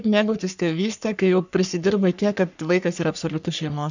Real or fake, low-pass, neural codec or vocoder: fake; 7.2 kHz; codec, 44.1 kHz, 3.4 kbps, Pupu-Codec